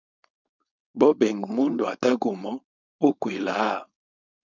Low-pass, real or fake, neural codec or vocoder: 7.2 kHz; fake; codec, 16 kHz, 4.8 kbps, FACodec